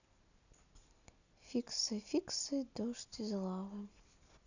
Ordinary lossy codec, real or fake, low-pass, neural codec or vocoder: none; real; 7.2 kHz; none